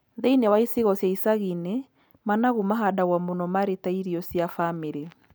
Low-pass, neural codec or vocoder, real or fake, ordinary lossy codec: none; none; real; none